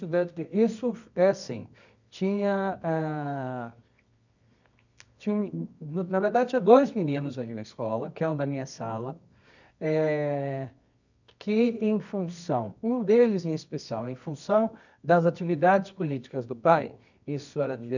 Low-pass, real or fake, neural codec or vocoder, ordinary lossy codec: 7.2 kHz; fake; codec, 24 kHz, 0.9 kbps, WavTokenizer, medium music audio release; none